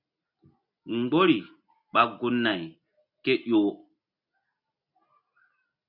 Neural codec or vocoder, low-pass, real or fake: none; 5.4 kHz; real